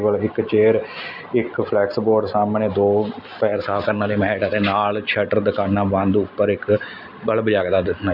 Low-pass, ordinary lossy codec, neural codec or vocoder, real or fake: 5.4 kHz; none; none; real